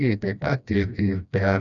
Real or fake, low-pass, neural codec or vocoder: fake; 7.2 kHz; codec, 16 kHz, 1 kbps, FreqCodec, smaller model